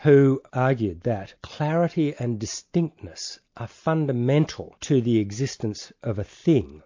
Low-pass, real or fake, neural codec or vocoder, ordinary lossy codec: 7.2 kHz; real; none; MP3, 48 kbps